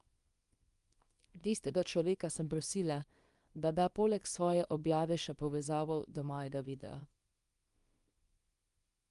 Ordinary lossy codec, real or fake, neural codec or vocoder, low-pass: Opus, 32 kbps; fake; codec, 24 kHz, 0.9 kbps, WavTokenizer, small release; 10.8 kHz